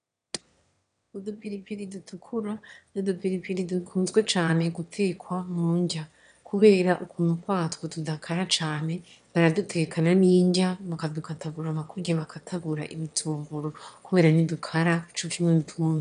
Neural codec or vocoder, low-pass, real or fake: autoencoder, 22.05 kHz, a latent of 192 numbers a frame, VITS, trained on one speaker; 9.9 kHz; fake